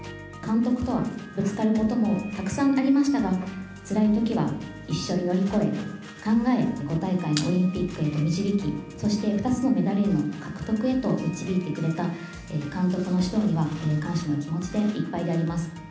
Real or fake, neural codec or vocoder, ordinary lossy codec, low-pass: real; none; none; none